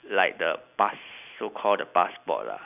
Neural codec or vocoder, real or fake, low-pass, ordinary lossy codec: none; real; 3.6 kHz; none